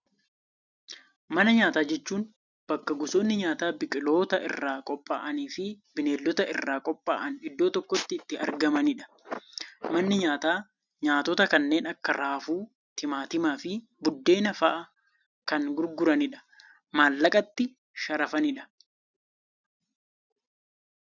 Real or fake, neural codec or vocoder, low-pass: real; none; 7.2 kHz